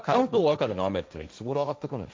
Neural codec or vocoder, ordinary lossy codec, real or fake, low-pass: codec, 16 kHz, 1.1 kbps, Voila-Tokenizer; none; fake; none